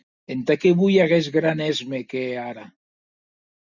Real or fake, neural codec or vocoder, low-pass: real; none; 7.2 kHz